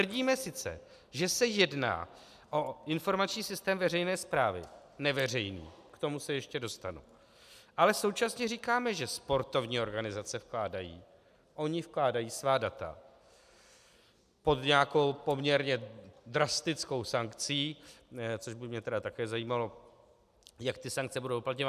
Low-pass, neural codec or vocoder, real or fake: 14.4 kHz; none; real